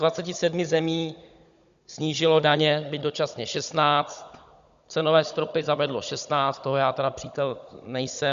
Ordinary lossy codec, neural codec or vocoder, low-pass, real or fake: Opus, 64 kbps; codec, 16 kHz, 16 kbps, FunCodec, trained on Chinese and English, 50 frames a second; 7.2 kHz; fake